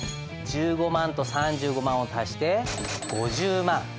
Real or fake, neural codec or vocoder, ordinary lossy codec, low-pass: real; none; none; none